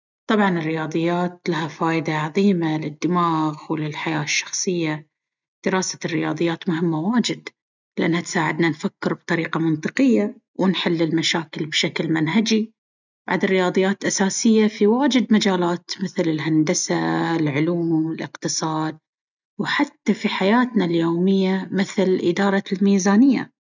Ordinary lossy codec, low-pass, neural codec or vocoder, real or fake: none; 7.2 kHz; none; real